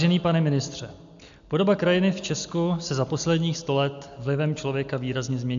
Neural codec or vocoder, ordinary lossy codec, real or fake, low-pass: none; MP3, 64 kbps; real; 7.2 kHz